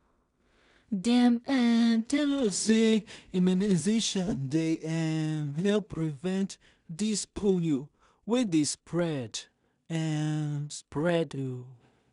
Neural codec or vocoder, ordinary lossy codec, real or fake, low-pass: codec, 16 kHz in and 24 kHz out, 0.4 kbps, LongCat-Audio-Codec, two codebook decoder; none; fake; 10.8 kHz